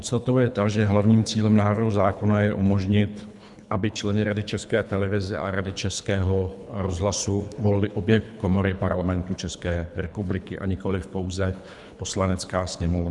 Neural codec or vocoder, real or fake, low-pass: codec, 24 kHz, 3 kbps, HILCodec; fake; 10.8 kHz